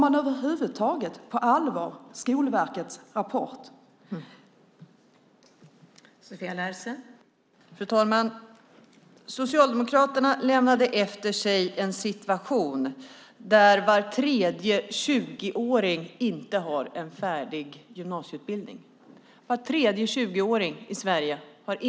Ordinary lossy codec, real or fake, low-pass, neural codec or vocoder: none; real; none; none